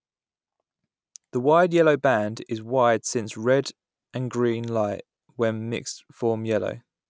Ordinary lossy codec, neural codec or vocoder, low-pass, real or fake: none; none; none; real